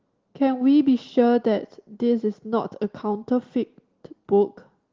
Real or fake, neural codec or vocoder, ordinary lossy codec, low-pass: real; none; Opus, 16 kbps; 7.2 kHz